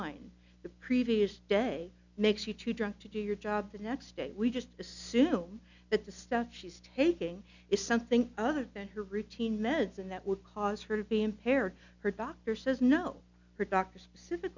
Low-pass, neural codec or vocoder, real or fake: 7.2 kHz; none; real